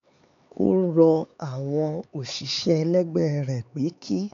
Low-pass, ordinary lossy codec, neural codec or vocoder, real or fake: 7.2 kHz; MP3, 64 kbps; codec, 16 kHz, 4 kbps, X-Codec, HuBERT features, trained on LibriSpeech; fake